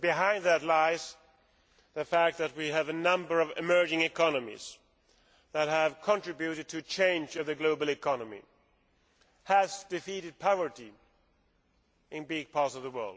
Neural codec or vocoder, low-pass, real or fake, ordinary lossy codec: none; none; real; none